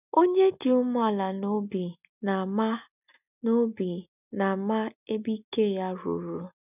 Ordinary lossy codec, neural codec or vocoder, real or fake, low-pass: none; none; real; 3.6 kHz